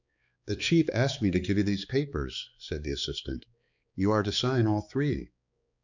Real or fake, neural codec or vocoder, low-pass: fake; codec, 16 kHz, 4 kbps, X-Codec, HuBERT features, trained on balanced general audio; 7.2 kHz